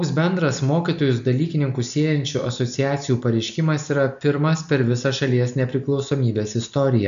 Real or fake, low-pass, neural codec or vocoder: real; 7.2 kHz; none